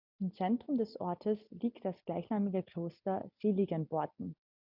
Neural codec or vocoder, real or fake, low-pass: none; real; 5.4 kHz